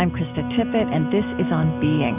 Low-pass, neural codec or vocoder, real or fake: 3.6 kHz; none; real